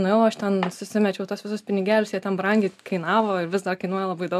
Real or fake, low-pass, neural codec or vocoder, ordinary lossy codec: real; 14.4 kHz; none; MP3, 96 kbps